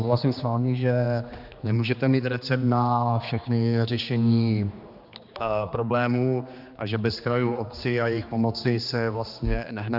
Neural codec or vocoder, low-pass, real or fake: codec, 16 kHz, 2 kbps, X-Codec, HuBERT features, trained on general audio; 5.4 kHz; fake